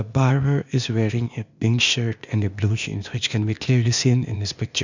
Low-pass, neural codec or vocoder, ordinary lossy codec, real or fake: 7.2 kHz; codec, 16 kHz, 0.8 kbps, ZipCodec; none; fake